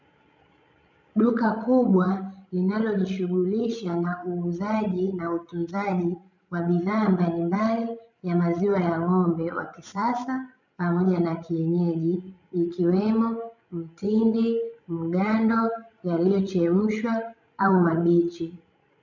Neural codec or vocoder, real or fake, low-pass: codec, 16 kHz, 16 kbps, FreqCodec, larger model; fake; 7.2 kHz